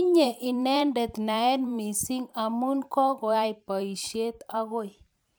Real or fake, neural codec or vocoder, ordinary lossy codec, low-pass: fake; vocoder, 44.1 kHz, 128 mel bands every 512 samples, BigVGAN v2; none; none